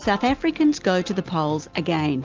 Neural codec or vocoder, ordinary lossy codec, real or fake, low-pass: none; Opus, 32 kbps; real; 7.2 kHz